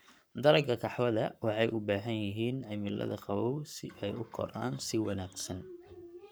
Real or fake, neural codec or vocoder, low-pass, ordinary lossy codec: fake; codec, 44.1 kHz, 7.8 kbps, Pupu-Codec; none; none